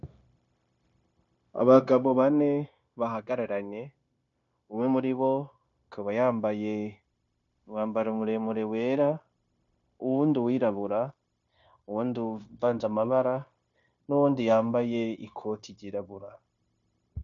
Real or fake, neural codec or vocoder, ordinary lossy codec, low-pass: fake; codec, 16 kHz, 0.9 kbps, LongCat-Audio-Codec; MP3, 64 kbps; 7.2 kHz